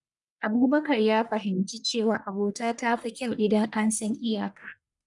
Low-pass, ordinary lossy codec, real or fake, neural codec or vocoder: 10.8 kHz; none; fake; codec, 44.1 kHz, 1.7 kbps, Pupu-Codec